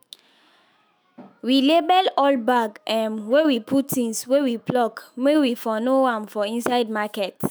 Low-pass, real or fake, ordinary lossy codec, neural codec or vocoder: none; fake; none; autoencoder, 48 kHz, 128 numbers a frame, DAC-VAE, trained on Japanese speech